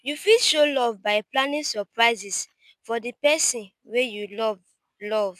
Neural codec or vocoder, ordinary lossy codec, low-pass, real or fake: none; none; 14.4 kHz; real